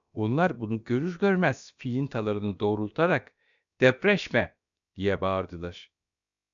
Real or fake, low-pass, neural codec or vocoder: fake; 7.2 kHz; codec, 16 kHz, about 1 kbps, DyCAST, with the encoder's durations